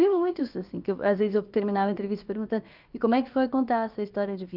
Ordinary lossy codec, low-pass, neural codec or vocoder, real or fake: Opus, 24 kbps; 5.4 kHz; codec, 16 kHz, about 1 kbps, DyCAST, with the encoder's durations; fake